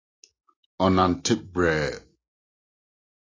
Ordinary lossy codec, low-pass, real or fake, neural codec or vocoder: AAC, 32 kbps; 7.2 kHz; real; none